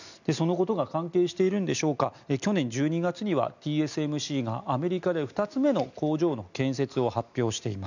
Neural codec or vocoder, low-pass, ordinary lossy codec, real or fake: none; 7.2 kHz; none; real